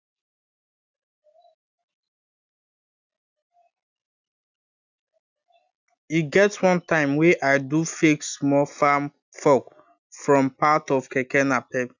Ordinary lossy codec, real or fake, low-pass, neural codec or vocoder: none; real; 7.2 kHz; none